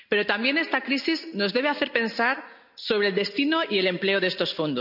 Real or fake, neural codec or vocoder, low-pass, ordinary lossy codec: real; none; 5.4 kHz; none